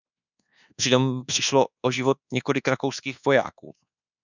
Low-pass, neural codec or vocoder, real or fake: 7.2 kHz; codec, 24 kHz, 1.2 kbps, DualCodec; fake